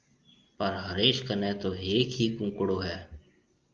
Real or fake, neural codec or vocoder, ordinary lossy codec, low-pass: real; none; Opus, 32 kbps; 7.2 kHz